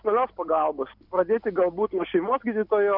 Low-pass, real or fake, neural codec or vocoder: 5.4 kHz; real; none